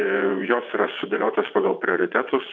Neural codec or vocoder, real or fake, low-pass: vocoder, 22.05 kHz, 80 mel bands, WaveNeXt; fake; 7.2 kHz